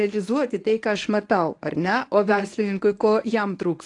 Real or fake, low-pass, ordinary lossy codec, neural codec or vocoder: fake; 10.8 kHz; AAC, 48 kbps; codec, 24 kHz, 0.9 kbps, WavTokenizer, medium speech release version 1